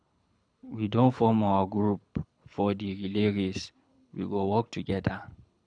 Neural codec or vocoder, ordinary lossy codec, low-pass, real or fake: codec, 24 kHz, 6 kbps, HILCodec; none; 9.9 kHz; fake